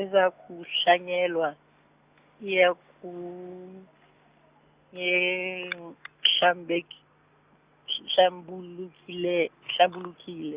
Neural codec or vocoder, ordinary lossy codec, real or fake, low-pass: none; Opus, 64 kbps; real; 3.6 kHz